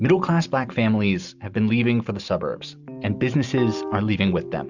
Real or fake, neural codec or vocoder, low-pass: real; none; 7.2 kHz